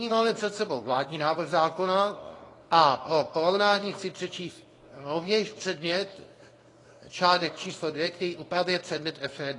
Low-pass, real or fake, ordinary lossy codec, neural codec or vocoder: 10.8 kHz; fake; AAC, 32 kbps; codec, 24 kHz, 0.9 kbps, WavTokenizer, small release